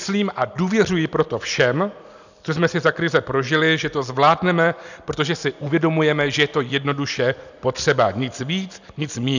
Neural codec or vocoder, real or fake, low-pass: none; real; 7.2 kHz